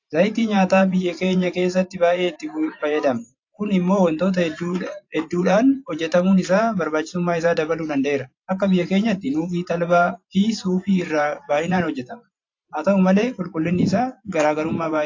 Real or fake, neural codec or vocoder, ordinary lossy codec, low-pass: fake; vocoder, 44.1 kHz, 128 mel bands every 512 samples, BigVGAN v2; AAC, 48 kbps; 7.2 kHz